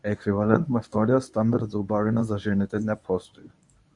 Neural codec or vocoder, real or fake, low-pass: codec, 24 kHz, 0.9 kbps, WavTokenizer, medium speech release version 1; fake; 10.8 kHz